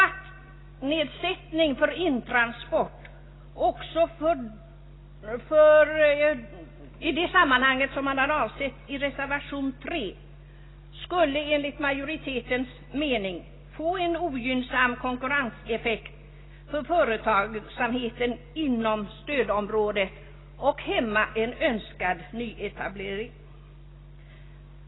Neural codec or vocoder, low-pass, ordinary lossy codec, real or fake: none; 7.2 kHz; AAC, 16 kbps; real